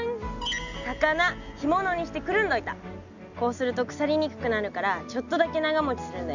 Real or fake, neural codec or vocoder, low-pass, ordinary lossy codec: real; none; 7.2 kHz; none